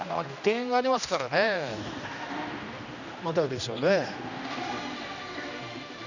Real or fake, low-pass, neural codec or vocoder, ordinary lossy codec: fake; 7.2 kHz; codec, 16 kHz, 1 kbps, X-Codec, HuBERT features, trained on general audio; none